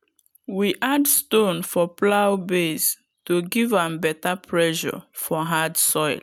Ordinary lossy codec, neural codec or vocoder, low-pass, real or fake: none; none; none; real